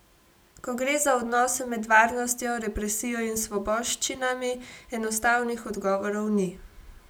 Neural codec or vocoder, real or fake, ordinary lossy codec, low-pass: none; real; none; none